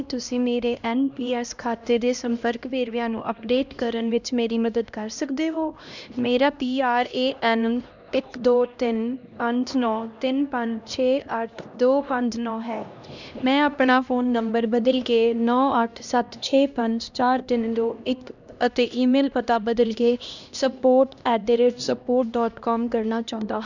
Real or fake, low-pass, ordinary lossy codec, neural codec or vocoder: fake; 7.2 kHz; none; codec, 16 kHz, 1 kbps, X-Codec, HuBERT features, trained on LibriSpeech